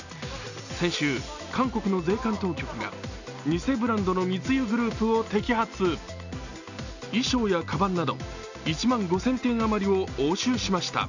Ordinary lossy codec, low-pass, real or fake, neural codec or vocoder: none; 7.2 kHz; real; none